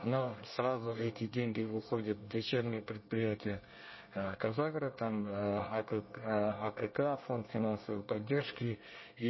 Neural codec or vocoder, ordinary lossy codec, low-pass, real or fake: codec, 24 kHz, 1 kbps, SNAC; MP3, 24 kbps; 7.2 kHz; fake